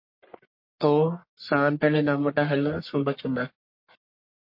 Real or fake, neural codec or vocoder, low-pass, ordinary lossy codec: fake; codec, 44.1 kHz, 1.7 kbps, Pupu-Codec; 5.4 kHz; MP3, 32 kbps